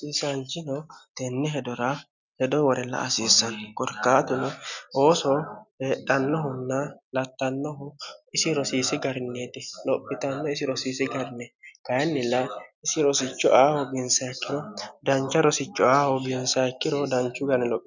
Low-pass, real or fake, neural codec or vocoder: 7.2 kHz; real; none